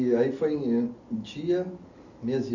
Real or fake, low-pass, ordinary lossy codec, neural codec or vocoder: real; 7.2 kHz; none; none